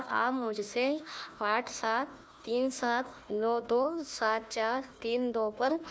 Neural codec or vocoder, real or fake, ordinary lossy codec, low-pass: codec, 16 kHz, 1 kbps, FunCodec, trained on Chinese and English, 50 frames a second; fake; none; none